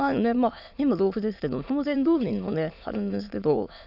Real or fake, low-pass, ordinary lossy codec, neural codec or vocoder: fake; 5.4 kHz; none; autoencoder, 22.05 kHz, a latent of 192 numbers a frame, VITS, trained on many speakers